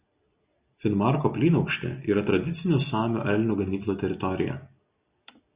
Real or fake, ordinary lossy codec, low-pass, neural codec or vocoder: real; Opus, 64 kbps; 3.6 kHz; none